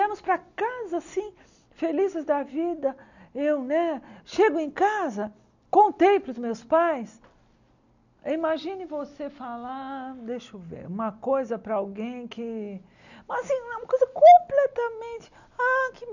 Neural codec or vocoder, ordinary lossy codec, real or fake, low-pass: none; none; real; 7.2 kHz